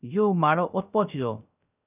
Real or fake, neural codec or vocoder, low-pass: fake; codec, 16 kHz, 0.3 kbps, FocalCodec; 3.6 kHz